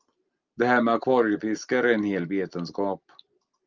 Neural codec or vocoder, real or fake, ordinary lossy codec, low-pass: none; real; Opus, 24 kbps; 7.2 kHz